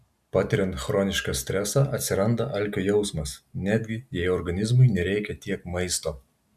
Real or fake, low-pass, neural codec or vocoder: real; 14.4 kHz; none